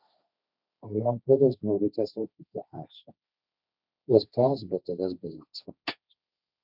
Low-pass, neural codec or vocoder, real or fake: 5.4 kHz; codec, 16 kHz, 1.1 kbps, Voila-Tokenizer; fake